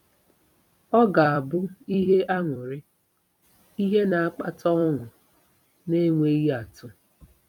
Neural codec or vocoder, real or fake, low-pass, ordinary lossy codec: vocoder, 44.1 kHz, 128 mel bands every 256 samples, BigVGAN v2; fake; 19.8 kHz; none